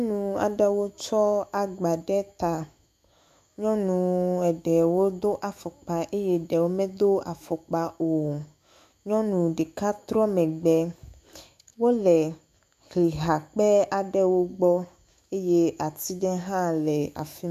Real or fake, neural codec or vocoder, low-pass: fake; autoencoder, 48 kHz, 128 numbers a frame, DAC-VAE, trained on Japanese speech; 14.4 kHz